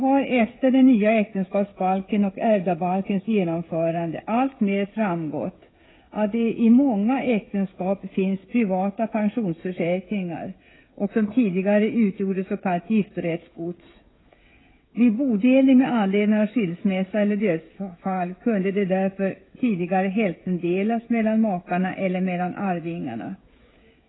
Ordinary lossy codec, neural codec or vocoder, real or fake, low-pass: AAC, 16 kbps; codec, 16 kHz, 16 kbps, FreqCodec, smaller model; fake; 7.2 kHz